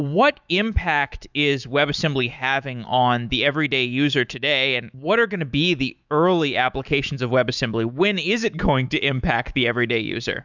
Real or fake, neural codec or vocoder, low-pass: fake; autoencoder, 48 kHz, 128 numbers a frame, DAC-VAE, trained on Japanese speech; 7.2 kHz